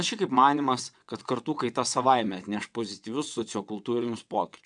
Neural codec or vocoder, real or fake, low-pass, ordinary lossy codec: vocoder, 22.05 kHz, 80 mel bands, WaveNeXt; fake; 9.9 kHz; MP3, 96 kbps